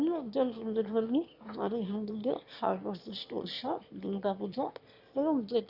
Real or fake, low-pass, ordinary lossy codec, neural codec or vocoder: fake; 5.4 kHz; none; autoencoder, 22.05 kHz, a latent of 192 numbers a frame, VITS, trained on one speaker